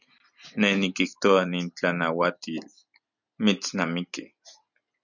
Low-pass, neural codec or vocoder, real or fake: 7.2 kHz; none; real